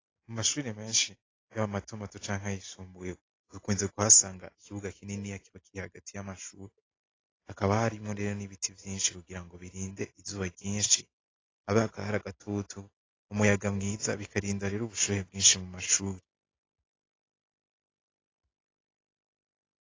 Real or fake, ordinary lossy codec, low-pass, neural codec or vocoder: real; AAC, 32 kbps; 7.2 kHz; none